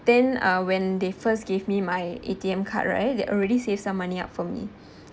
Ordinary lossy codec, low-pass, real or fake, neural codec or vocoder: none; none; real; none